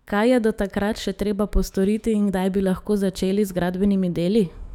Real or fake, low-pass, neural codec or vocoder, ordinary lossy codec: fake; 19.8 kHz; autoencoder, 48 kHz, 128 numbers a frame, DAC-VAE, trained on Japanese speech; none